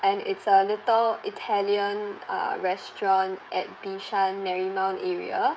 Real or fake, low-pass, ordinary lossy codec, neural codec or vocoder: fake; none; none; codec, 16 kHz, 16 kbps, FreqCodec, smaller model